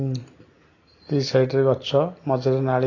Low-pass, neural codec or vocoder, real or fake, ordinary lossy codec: 7.2 kHz; none; real; AAC, 32 kbps